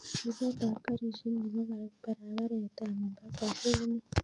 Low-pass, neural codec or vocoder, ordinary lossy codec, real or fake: 10.8 kHz; codec, 44.1 kHz, 7.8 kbps, DAC; none; fake